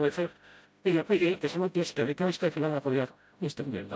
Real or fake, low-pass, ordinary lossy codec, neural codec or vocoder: fake; none; none; codec, 16 kHz, 0.5 kbps, FreqCodec, smaller model